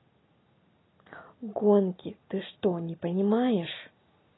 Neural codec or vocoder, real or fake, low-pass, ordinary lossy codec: none; real; 7.2 kHz; AAC, 16 kbps